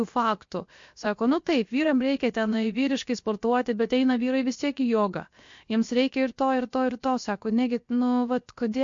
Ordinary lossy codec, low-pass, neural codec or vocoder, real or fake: MP3, 48 kbps; 7.2 kHz; codec, 16 kHz, 0.7 kbps, FocalCodec; fake